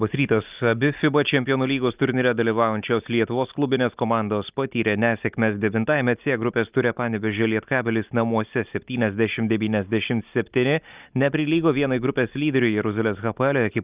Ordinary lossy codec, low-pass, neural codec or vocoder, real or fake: Opus, 24 kbps; 3.6 kHz; none; real